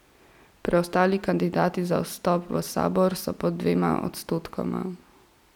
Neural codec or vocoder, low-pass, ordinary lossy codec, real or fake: none; 19.8 kHz; none; real